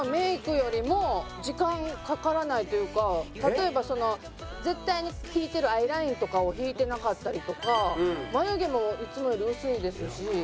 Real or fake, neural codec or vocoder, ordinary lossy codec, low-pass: real; none; none; none